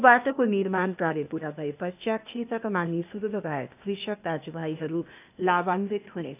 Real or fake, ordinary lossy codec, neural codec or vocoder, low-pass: fake; none; codec, 16 kHz, 0.8 kbps, ZipCodec; 3.6 kHz